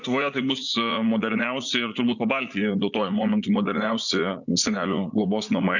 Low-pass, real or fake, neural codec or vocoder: 7.2 kHz; fake; vocoder, 44.1 kHz, 80 mel bands, Vocos